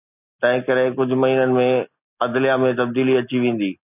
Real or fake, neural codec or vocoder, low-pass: real; none; 3.6 kHz